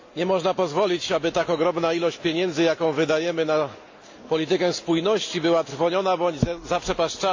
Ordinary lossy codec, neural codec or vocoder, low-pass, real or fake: AAC, 48 kbps; none; 7.2 kHz; real